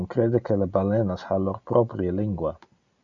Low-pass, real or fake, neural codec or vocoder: 7.2 kHz; real; none